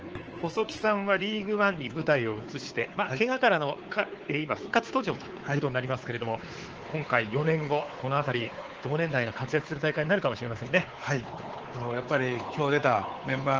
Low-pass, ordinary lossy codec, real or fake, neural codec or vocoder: 7.2 kHz; Opus, 16 kbps; fake; codec, 16 kHz, 4 kbps, X-Codec, WavLM features, trained on Multilingual LibriSpeech